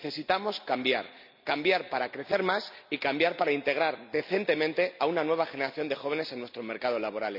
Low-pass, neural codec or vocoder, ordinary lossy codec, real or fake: 5.4 kHz; none; none; real